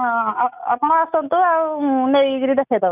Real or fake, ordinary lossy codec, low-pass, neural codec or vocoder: real; none; 3.6 kHz; none